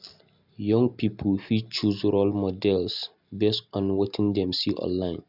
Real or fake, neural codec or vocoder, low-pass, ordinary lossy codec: real; none; 5.4 kHz; none